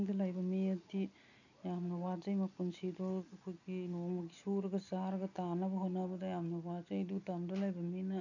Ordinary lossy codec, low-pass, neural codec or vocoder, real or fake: none; 7.2 kHz; none; real